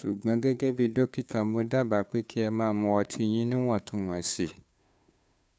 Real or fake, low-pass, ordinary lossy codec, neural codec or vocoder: fake; none; none; codec, 16 kHz, 2 kbps, FunCodec, trained on Chinese and English, 25 frames a second